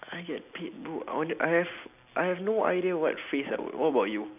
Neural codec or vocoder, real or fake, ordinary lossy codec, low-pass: none; real; none; 3.6 kHz